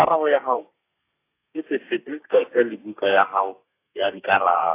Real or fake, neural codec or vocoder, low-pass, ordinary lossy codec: fake; codec, 32 kHz, 1.9 kbps, SNAC; 3.6 kHz; AAC, 24 kbps